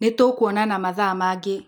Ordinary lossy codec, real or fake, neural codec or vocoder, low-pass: none; real; none; none